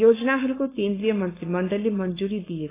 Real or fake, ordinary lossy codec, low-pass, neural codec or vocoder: fake; AAC, 16 kbps; 3.6 kHz; codec, 16 kHz, 4.8 kbps, FACodec